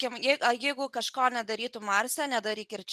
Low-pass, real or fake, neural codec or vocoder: 14.4 kHz; real; none